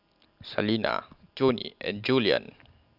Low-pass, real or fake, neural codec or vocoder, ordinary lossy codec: 5.4 kHz; real; none; none